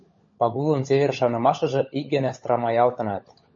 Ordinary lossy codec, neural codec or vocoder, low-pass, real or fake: MP3, 32 kbps; codec, 16 kHz, 16 kbps, FunCodec, trained on LibriTTS, 50 frames a second; 7.2 kHz; fake